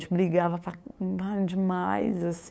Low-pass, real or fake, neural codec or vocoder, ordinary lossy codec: none; fake; codec, 16 kHz, 8 kbps, FunCodec, trained on LibriTTS, 25 frames a second; none